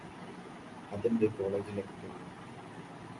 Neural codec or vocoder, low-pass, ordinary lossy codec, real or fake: none; 10.8 kHz; MP3, 64 kbps; real